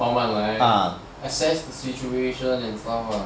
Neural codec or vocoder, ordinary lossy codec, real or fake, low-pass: none; none; real; none